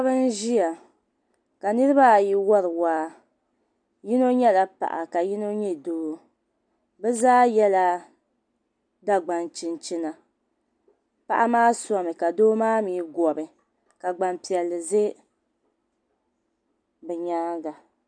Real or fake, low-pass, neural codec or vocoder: real; 9.9 kHz; none